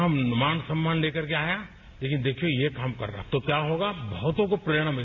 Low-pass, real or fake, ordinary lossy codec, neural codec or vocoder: 7.2 kHz; real; none; none